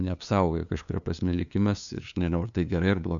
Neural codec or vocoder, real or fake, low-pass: codec, 16 kHz, 2 kbps, FunCodec, trained on LibriTTS, 25 frames a second; fake; 7.2 kHz